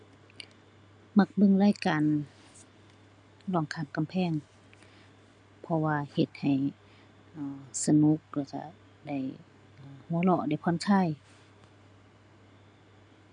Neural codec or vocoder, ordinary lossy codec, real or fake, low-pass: none; none; real; 9.9 kHz